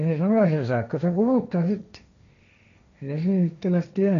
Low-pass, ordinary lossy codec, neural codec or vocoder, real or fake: 7.2 kHz; none; codec, 16 kHz, 1.1 kbps, Voila-Tokenizer; fake